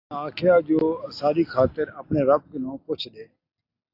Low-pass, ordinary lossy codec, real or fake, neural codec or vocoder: 5.4 kHz; AAC, 32 kbps; real; none